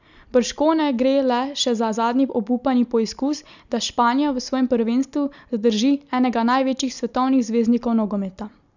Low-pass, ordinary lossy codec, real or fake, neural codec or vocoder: 7.2 kHz; none; real; none